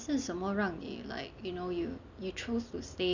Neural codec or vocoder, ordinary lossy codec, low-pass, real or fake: none; none; 7.2 kHz; real